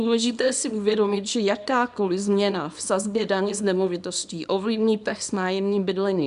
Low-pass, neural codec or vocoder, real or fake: 10.8 kHz; codec, 24 kHz, 0.9 kbps, WavTokenizer, small release; fake